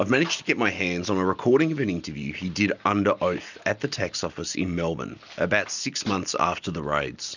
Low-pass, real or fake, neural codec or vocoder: 7.2 kHz; real; none